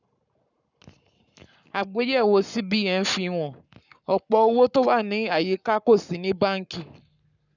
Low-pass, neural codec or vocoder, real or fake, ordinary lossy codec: 7.2 kHz; codec, 44.1 kHz, 7.8 kbps, Pupu-Codec; fake; none